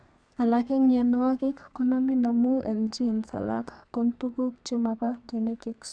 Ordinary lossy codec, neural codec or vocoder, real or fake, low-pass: none; codec, 32 kHz, 1.9 kbps, SNAC; fake; 9.9 kHz